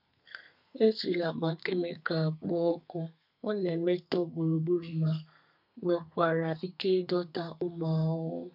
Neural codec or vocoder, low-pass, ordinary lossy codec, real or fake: codec, 32 kHz, 1.9 kbps, SNAC; 5.4 kHz; none; fake